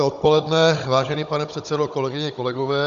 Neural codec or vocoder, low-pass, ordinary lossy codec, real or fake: codec, 16 kHz, 16 kbps, FunCodec, trained on Chinese and English, 50 frames a second; 7.2 kHz; Opus, 64 kbps; fake